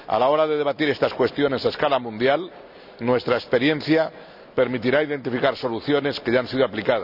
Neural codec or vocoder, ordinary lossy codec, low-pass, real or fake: none; none; 5.4 kHz; real